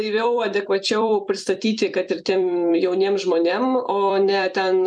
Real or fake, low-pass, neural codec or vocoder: fake; 9.9 kHz; vocoder, 44.1 kHz, 128 mel bands every 256 samples, BigVGAN v2